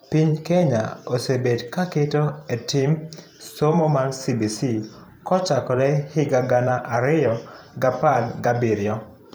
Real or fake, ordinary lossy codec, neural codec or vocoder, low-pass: fake; none; vocoder, 44.1 kHz, 128 mel bands every 512 samples, BigVGAN v2; none